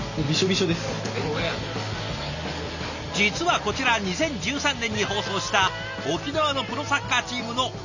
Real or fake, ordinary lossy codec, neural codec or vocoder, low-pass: real; none; none; 7.2 kHz